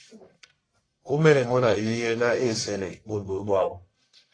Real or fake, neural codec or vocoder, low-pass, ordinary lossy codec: fake; codec, 44.1 kHz, 1.7 kbps, Pupu-Codec; 9.9 kHz; AAC, 32 kbps